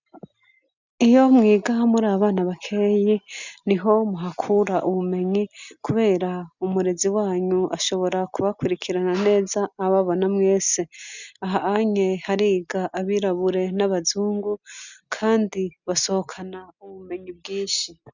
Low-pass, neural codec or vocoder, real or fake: 7.2 kHz; none; real